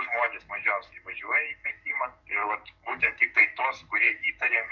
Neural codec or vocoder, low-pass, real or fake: codec, 44.1 kHz, 7.8 kbps, DAC; 7.2 kHz; fake